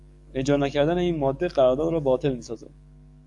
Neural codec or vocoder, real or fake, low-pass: codec, 44.1 kHz, 7.8 kbps, DAC; fake; 10.8 kHz